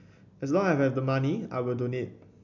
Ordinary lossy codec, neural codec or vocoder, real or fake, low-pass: none; none; real; 7.2 kHz